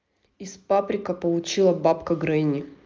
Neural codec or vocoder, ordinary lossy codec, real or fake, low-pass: none; Opus, 24 kbps; real; 7.2 kHz